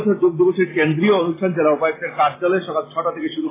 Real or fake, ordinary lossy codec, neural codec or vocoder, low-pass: real; AAC, 16 kbps; none; 3.6 kHz